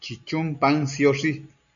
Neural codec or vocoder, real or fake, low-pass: none; real; 7.2 kHz